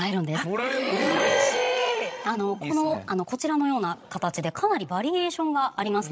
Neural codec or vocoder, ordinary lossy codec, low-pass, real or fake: codec, 16 kHz, 16 kbps, FreqCodec, larger model; none; none; fake